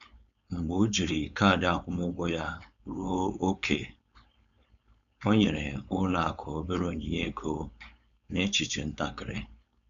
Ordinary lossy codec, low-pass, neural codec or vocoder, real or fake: MP3, 96 kbps; 7.2 kHz; codec, 16 kHz, 4.8 kbps, FACodec; fake